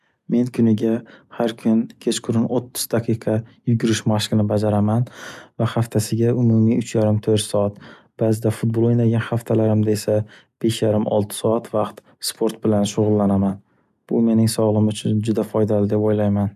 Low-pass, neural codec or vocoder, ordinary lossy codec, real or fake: 14.4 kHz; none; none; real